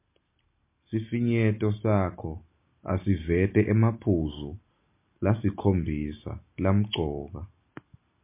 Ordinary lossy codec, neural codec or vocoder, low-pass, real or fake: MP3, 24 kbps; none; 3.6 kHz; real